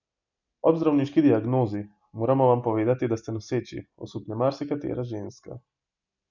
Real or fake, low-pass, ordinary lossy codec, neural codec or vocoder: real; 7.2 kHz; none; none